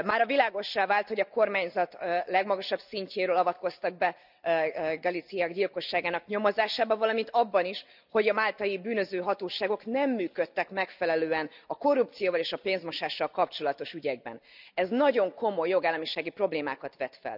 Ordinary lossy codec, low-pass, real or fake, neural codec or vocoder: none; 5.4 kHz; real; none